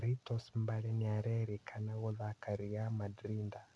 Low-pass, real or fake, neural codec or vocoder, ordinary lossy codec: none; real; none; none